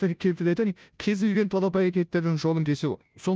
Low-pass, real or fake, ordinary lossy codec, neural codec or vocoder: none; fake; none; codec, 16 kHz, 0.5 kbps, FunCodec, trained on Chinese and English, 25 frames a second